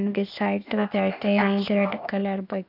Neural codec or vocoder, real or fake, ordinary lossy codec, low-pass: codec, 16 kHz, 0.8 kbps, ZipCodec; fake; none; 5.4 kHz